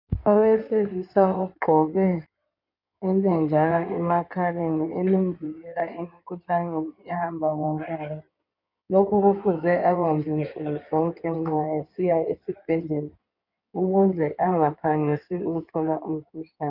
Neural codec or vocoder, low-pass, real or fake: codec, 16 kHz in and 24 kHz out, 2.2 kbps, FireRedTTS-2 codec; 5.4 kHz; fake